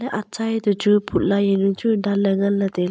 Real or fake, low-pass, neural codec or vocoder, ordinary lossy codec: real; none; none; none